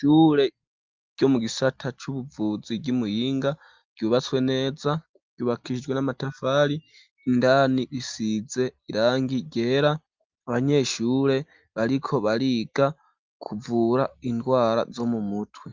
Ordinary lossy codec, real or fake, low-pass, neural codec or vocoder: Opus, 32 kbps; real; 7.2 kHz; none